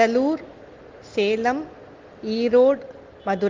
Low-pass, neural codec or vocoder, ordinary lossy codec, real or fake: 7.2 kHz; none; Opus, 16 kbps; real